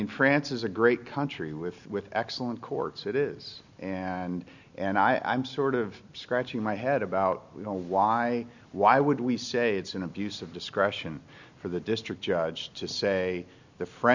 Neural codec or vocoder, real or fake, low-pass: none; real; 7.2 kHz